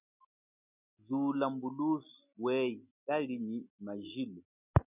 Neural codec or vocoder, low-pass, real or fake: none; 3.6 kHz; real